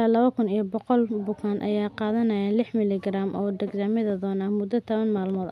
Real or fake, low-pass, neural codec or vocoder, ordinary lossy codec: real; 14.4 kHz; none; none